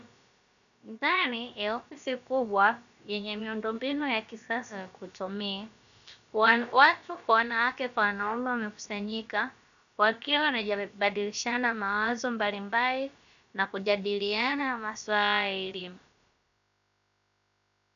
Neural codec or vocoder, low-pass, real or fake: codec, 16 kHz, about 1 kbps, DyCAST, with the encoder's durations; 7.2 kHz; fake